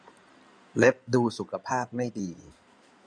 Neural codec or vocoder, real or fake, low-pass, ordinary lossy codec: codec, 16 kHz in and 24 kHz out, 2.2 kbps, FireRedTTS-2 codec; fake; 9.9 kHz; none